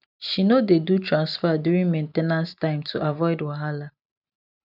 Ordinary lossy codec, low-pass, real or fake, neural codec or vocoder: none; 5.4 kHz; real; none